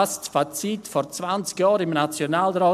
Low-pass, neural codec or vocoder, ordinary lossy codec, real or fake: 14.4 kHz; none; AAC, 96 kbps; real